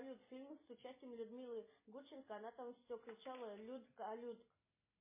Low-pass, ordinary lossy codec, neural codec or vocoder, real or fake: 3.6 kHz; MP3, 16 kbps; none; real